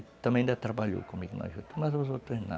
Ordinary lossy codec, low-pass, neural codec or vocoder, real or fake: none; none; none; real